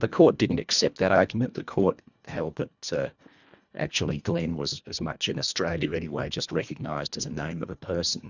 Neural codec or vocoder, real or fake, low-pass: codec, 24 kHz, 1.5 kbps, HILCodec; fake; 7.2 kHz